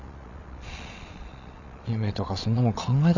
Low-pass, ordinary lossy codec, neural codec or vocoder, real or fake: 7.2 kHz; none; vocoder, 22.05 kHz, 80 mel bands, Vocos; fake